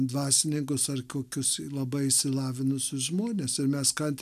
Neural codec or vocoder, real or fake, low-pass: none; real; 14.4 kHz